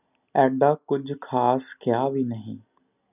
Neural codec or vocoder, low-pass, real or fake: none; 3.6 kHz; real